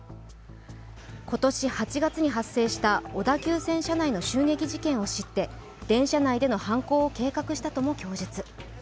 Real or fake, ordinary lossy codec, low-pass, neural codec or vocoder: real; none; none; none